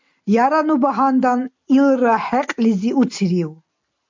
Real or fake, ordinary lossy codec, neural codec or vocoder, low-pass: real; MP3, 64 kbps; none; 7.2 kHz